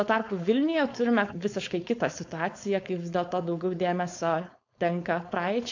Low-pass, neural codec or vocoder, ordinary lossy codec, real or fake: 7.2 kHz; codec, 16 kHz, 4.8 kbps, FACodec; AAC, 48 kbps; fake